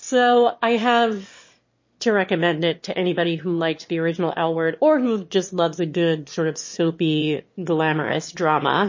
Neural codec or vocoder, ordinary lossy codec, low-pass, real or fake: autoencoder, 22.05 kHz, a latent of 192 numbers a frame, VITS, trained on one speaker; MP3, 32 kbps; 7.2 kHz; fake